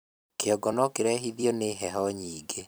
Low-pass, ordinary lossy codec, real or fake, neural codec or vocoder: none; none; fake; vocoder, 44.1 kHz, 128 mel bands every 256 samples, BigVGAN v2